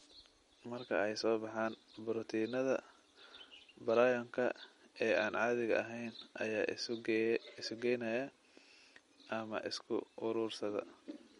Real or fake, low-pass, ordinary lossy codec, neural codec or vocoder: real; 19.8 kHz; MP3, 48 kbps; none